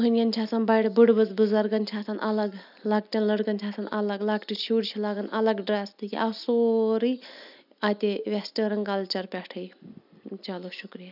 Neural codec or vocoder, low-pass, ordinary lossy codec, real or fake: none; 5.4 kHz; none; real